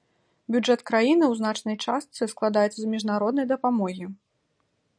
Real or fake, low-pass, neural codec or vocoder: real; 9.9 kHz; none